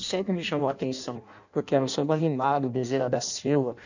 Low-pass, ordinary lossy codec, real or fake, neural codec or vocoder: 7.2 kHz; none; fake; codec, 16 kHz in and 24 kHz out, 0.6 kbps, FireRedTTS-2 codec